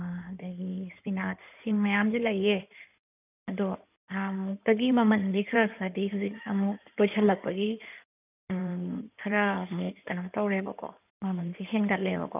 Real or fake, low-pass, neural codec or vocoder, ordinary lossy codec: fake; 3.6 kHz; codec, 16 kHz in and 24 kHz out, 2.2 kbps, FireRedTTS-2 codec; none